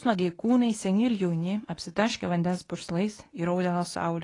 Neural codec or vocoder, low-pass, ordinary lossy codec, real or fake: codec, 24 kHz, 0.9 kbps, WavTokenizer, medium speech release version 2; 10.8 kHz; AAC, 32 kbps; fake